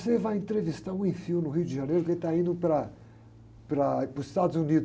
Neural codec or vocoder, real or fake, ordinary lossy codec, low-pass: none; real; none; none